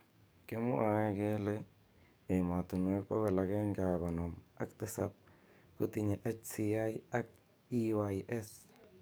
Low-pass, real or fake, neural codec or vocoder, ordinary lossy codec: none; fake; codec, 44.1 kHz, 7.8 kbps, DAC; none